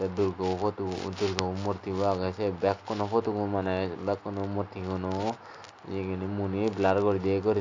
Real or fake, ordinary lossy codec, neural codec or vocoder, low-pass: real; AAC, 48 kbps; none; 7.2 kHz